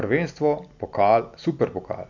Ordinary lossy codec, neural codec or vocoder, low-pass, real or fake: none; none; 7.2 kHz; real